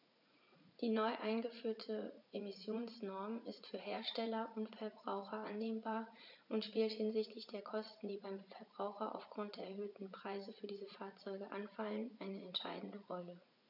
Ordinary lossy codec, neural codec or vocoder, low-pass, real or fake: none; vocoder, 44.1 kHz, 80 mel bands, Vocos; 5.4 kHz; fake